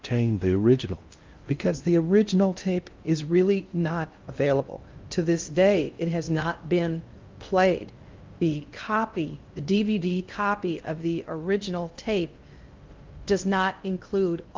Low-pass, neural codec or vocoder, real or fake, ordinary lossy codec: 7.2 kHz; codec, 16 kHz in and 24 kHz out, 0.6 kbps, FocalCodec, streaming, 4096 codes; fake; Opus, 32 kbps